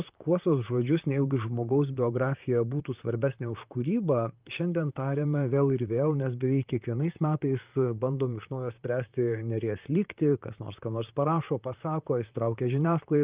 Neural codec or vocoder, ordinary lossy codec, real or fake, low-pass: vocoder, 44.1 kHz, 128 mel bands, Pupu-Vocoder; Opus, 24 kbps; fake; 3.6 kHz